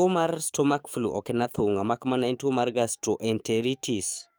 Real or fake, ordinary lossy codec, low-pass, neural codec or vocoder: fake; none; none; codec, 44.1 kHz, 7.8 kbps, DAC